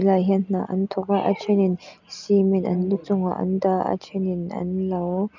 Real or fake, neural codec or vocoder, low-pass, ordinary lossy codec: real; none; 7.2 kHz; none